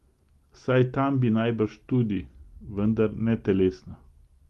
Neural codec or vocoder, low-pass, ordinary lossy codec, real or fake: none; 14.4 kHz; Opus, 24 kbps; real